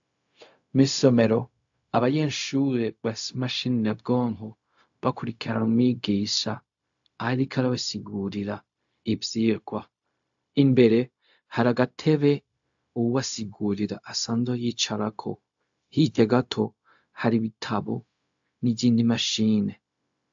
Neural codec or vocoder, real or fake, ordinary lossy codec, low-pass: codec, 16 kHz, 0.4 kbps, LongCat-Audio-Codec; fake; AAC, 64 kbps; 7.2 kHz